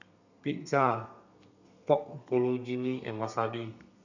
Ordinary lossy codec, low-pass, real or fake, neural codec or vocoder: none; 7.2 kHz; fake; codec, 32 kHz, 1.9 kbps, SNAC